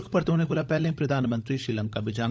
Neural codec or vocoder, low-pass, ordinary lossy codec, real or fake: codec, 16 kHz, 16 kbps, FunCodec, trained on LibriTTS, 50 frames a second; none; none; fake